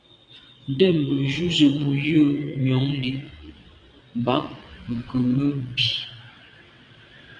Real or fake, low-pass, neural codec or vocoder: fake; 9.9 kHz; vocoder, 22.05 kHz, 80 mel bands, WaveNeXt